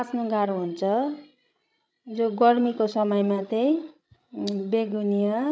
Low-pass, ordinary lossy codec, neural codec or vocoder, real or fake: none; none; codec, 16 kHz, 16 kbps, FreqCodec, larger model; fake